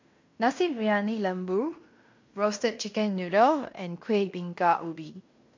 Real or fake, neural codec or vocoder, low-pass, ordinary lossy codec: fake; codec, 16 kHz in and 24 kHz out, 0.9 kbps, LongCat-Audio-Codec, fine tuned four codebook decoder; 7.2 kHz; MP3, 48 kbps